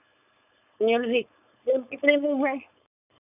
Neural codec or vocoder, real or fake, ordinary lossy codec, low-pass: codec, 16 kHz, 4.8 kbps, FACodec; fake; none; 3.6 kHz